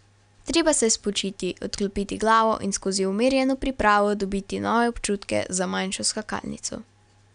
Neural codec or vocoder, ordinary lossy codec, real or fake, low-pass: none; none; real; 9.9 kHz